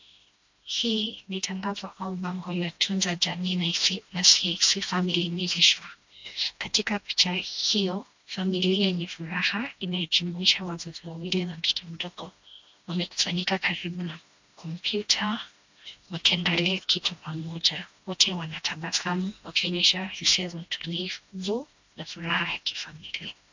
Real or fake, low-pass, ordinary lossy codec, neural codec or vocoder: fake; 7.2 kHz; MP3, 64 kbps; codec, 16 kHz, 1 kbps, FreqCodec, smaller model